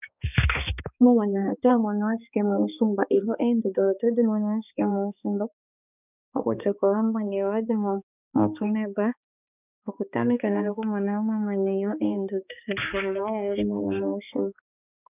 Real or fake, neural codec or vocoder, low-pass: fake; codec, 16 kHz, 2 kbps, X-Codec, HuBERT features, trained on balanced general audio; 3.6 kHz